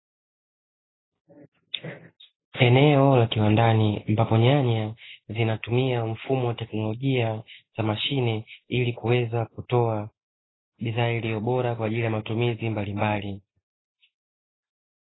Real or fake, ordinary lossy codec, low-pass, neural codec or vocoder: real; AAC, 16 kbps; 7.2 kHz; none